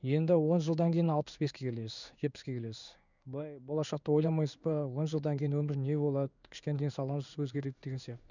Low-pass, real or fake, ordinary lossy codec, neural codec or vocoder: 7.2 kHz; fake; none; codec, 16 kHz in and 24 kHz out, 1 kbps, XY-Tokenizer